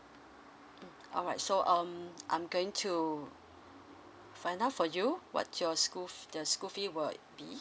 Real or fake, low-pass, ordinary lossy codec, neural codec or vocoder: real; none; none; none